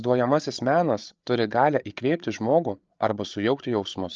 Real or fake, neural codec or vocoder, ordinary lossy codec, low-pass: fake; codec, 16 kHz, 8 kbps, FreqCodec, larger model; Opus, 24 kbps; 7.2 kHz